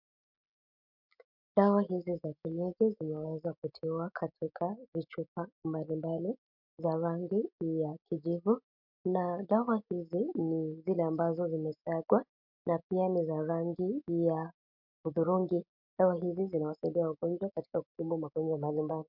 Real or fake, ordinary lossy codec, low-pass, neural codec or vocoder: real; MP3, 48 kbps; 5.4 kHz; none